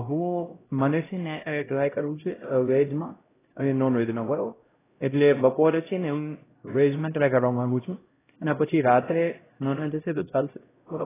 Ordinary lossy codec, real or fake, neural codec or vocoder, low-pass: AAC, 16 kbps; fake; codec, 16 kHz, 0.5 kbps, X-Codec, HuBERT features, trained on LibriSpeech; 3.6 kHz